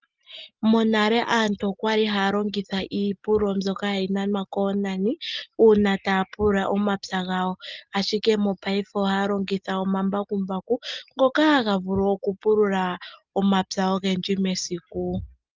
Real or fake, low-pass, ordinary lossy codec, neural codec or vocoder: real; 7.2 kHz; Opus, 32 kbps; none